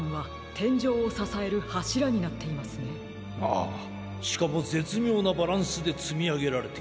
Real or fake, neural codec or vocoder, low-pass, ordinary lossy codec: real; none; none; none